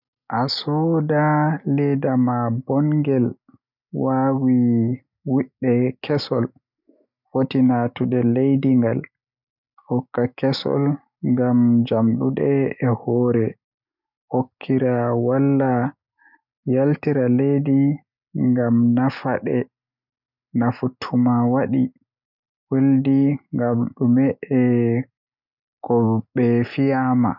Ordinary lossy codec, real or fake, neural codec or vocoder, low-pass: none; real; none; 5.4 kHz